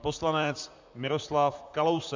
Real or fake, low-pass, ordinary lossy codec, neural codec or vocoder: fake; 7.2 kHz; MP3, 64 kbps; vocoder, 44.1 kHz, 128 mel bands, Pupu-Vocoder